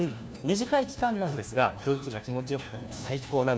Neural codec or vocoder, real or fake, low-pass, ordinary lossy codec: codec, 16 kHz, 1 kbps, FunCodec, trained on LibriTTS, 50 frames a second; fake; none; none